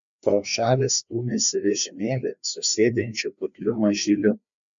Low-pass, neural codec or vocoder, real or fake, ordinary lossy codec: 7.2 kHz; codec, 16 kHz, 2 kbps, FreqCodec, larger model; fake; MP3, 64 kbps